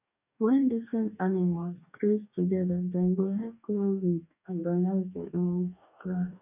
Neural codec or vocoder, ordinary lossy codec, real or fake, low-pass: codec, 44.1 kHz, 2.6 kbps, DAC; none; fake; 3.6 kHz